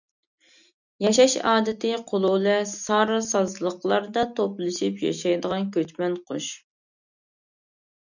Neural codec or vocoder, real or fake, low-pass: none; real; 7.2 kHz